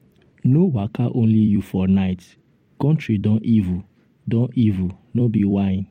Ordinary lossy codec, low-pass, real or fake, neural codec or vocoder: MP3, 64 kbps; 19.8 kHz; fake; vocoder, 44.1 kHz, 128 mel bands every 256 samples, BigVGAN v2